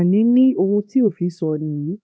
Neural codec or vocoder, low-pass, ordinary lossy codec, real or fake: codec, 16 kHz, 2 kbps, X-Codec, HuBERT features, trained on LibriSpeech; none; none; fake